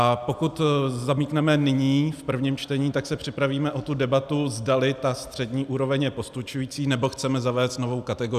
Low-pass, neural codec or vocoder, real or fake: 14.4 kHz; none; real